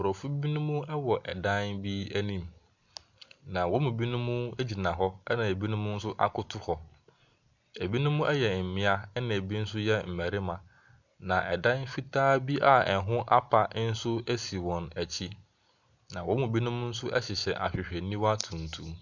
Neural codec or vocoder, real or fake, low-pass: none; real; 7.2 kHz